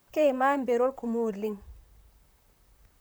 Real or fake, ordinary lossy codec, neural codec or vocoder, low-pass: fake; none; vocoder, 44.1 kHz, 128 mel bands, Pupu-Vocoder; none